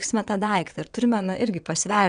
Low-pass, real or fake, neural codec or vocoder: 9.9 kHz; fake; vocoder, 22.05 kHz, 80 mel bands, WaveNeXt